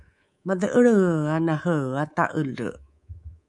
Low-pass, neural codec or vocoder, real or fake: 10.8 kHz; codec, 24 kHz, 3.1 kbps, DualCodec; fake